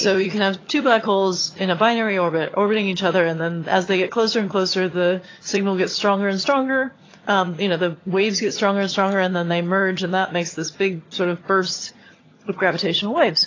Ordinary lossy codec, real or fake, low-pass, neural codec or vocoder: AAC, 32 kbps; fake; 7.2 kHz; vocoder, 22.05 kHz, 80 mel bands, HiFi-GAN